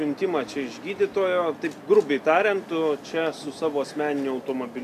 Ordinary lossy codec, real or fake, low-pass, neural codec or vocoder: AAC, 96 kbps; real; 14.4 kHz; none